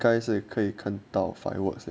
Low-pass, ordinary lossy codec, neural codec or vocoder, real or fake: none; none; none; real